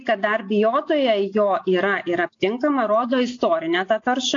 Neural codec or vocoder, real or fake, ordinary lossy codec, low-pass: none; real; AAC, 48 kbps; 7.2 kHz